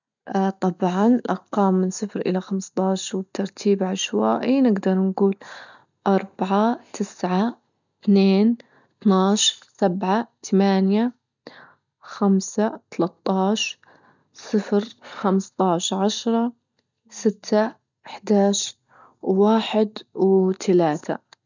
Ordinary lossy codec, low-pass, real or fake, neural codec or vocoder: none; 7.2 kHz; real; none